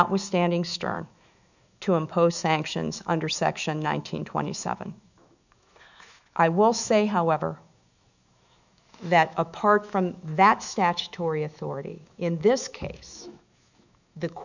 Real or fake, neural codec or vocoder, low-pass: fake; autoencoder, 48 kHz, 128 numbers a frame, DAC-VAE, trained on Japanese speech; 7.2 kHz